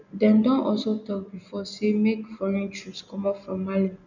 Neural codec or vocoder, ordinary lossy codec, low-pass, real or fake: none; none; 7.2 kHz; real